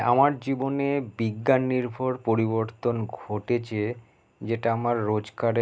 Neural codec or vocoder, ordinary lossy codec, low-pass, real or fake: none; none; none; real